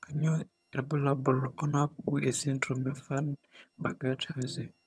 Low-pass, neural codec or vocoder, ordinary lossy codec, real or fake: none; vocoder, 22.05 kHz, 80 mel bands, HiFi-GAN; none; fake